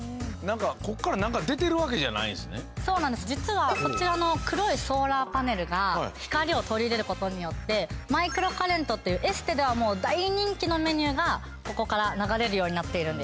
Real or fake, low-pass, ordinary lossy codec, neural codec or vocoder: real; none; none; none